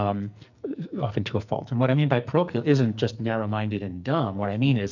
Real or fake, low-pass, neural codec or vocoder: fake; 7.2 kHz; codec, 44.1 kHz, 2.6 kbps, SNAC